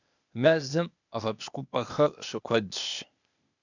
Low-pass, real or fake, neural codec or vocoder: 7.2 kHz; fake; codec, 16 kHz, 0.8 kbps, ZipCodec